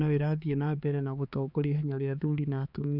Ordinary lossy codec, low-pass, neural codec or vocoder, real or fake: none; 5.4 kHz; codec, 16 kHz, 4 kbps, X-Codec, HuBERT features, trained on balanced general audio; fake